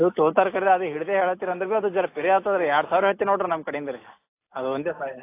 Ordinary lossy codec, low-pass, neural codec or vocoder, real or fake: AAC, 24 kbps; 3.6 kHz; none; real